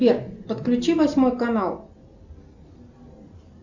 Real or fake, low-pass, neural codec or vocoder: real; 7.2 kHz; none